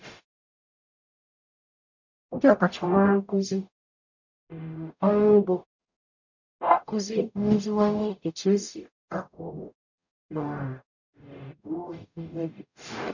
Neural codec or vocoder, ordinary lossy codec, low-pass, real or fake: codec, 44.1 kHz, 0.9 kbps, DAC; none; 7.2 kHz; fake